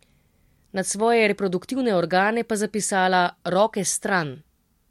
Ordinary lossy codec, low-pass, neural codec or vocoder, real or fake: MP3, 64 kbps; 19.8 kHz; none; real